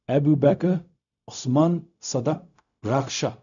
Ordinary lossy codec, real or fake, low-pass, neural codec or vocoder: none; fake; 7.2 kHz; codec, 16 kHz, 0.4 kbps, LongCat-Audio-Codec